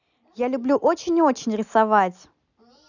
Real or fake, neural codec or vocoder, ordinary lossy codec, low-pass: real; none; none; 7.2 kHz